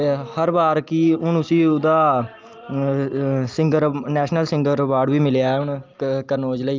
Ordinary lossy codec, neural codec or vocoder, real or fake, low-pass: Opus, 24 kbps; none; real; 7.2 kHz